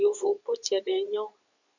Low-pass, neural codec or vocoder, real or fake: 7.2 kHz; codec, 24 kHz, 0.9 kbps, WavTokenizer, medium speech release version 2; fake